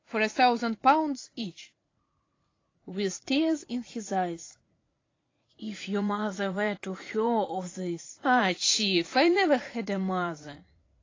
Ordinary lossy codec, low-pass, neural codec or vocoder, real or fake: AAC, 32 kbps; 7.2 kHz; vocoder, 44.1 kHz, 80 mel bands, Vocos; fake